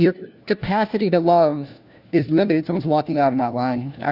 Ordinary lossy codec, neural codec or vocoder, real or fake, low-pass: Opus, 64 kbps; codec, 16 kHz, 1 kbps, FunCodec, trained on LibriTTS, 50 frames a second; fake; 5.4 kHz